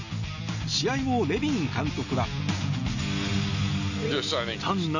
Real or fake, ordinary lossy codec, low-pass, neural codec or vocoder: real; none; 7.2 kHz; none